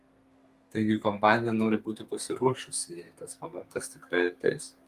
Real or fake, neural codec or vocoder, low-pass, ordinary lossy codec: fake; codec, 32 kHz, 1.9 kbps, SNAC; 14.4 kHz; Opus, 32 kbps